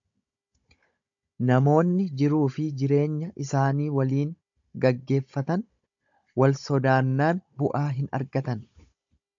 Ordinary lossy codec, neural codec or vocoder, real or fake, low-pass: AAC, 64 kbps; codec, 16 kHz, 16 kbps, FunCodec, trained on Chinese and English, 50 frames a second; fake; 7.2 kHz